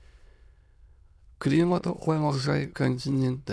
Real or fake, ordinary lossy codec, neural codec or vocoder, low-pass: fake; none; autoencoder, 22.05 kHz, a latent of 192 numbers a frame, VITS, trained on many speakers; none